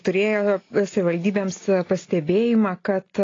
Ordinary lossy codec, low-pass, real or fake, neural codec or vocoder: AAC, 32 kbps; 7.2 kHz; real; none